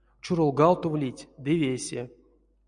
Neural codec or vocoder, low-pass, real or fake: none; 9.9 kHz; real